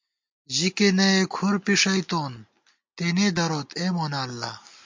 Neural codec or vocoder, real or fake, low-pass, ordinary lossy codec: none; real; 7.2 kHz; MP3, 48 kbps